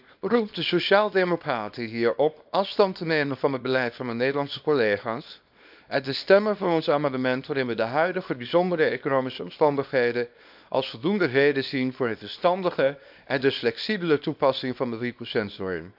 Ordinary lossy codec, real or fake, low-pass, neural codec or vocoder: AAC, 48 kbps; fake; 5.4 kHz; codec, 24 kHz, 0.9 kbps, WavTokenizer, small release